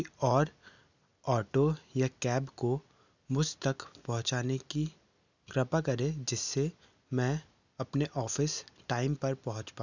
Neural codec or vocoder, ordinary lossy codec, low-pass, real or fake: none; none; 7.2 kHz; real